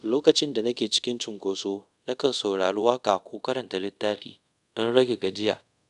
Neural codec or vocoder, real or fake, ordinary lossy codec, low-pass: codec, 24 kHz, 0.5 kbps, DualCodec; fake; none; 10.8 kHz